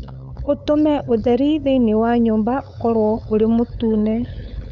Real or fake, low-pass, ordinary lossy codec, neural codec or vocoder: fake; 7.2 kHz; none; codec, 16 kHz, 8 kbps, FunCodec, trained on LibriTTS, 25 frames a second